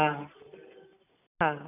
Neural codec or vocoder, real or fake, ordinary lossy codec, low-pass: none; real; none; 3.6 kHz